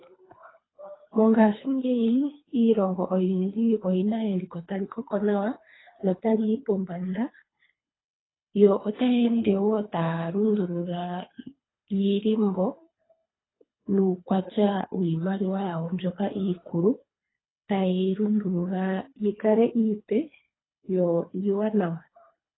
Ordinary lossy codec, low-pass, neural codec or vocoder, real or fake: AAC, 16 kbps; 7.2 kHz; codec, 24 kHz, 3 kbps, HILCodec; fake